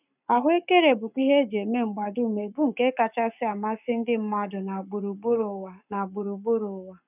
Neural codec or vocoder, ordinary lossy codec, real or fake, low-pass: codec, 44.1 kHz, 7.8 kbps, Pupu-Codec; none; fake; 3.6 kHz